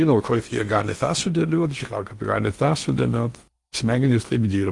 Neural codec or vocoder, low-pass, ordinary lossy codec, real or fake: codec, 16 kHz in and 24 kHz out, 0.8 kbps, FocalCodec, streaming, 65536 codes; 10.8 kHz; Opus, 32 kbps; fake